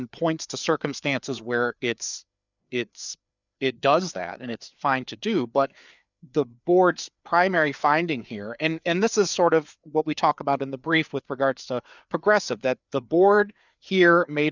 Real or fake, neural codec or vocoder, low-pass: fake; codec, 16 kHz, 4 kbps, FreqCodec, larger model; 7.2 kHz